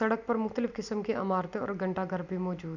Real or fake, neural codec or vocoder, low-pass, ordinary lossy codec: real; none; 7.2 kHz; none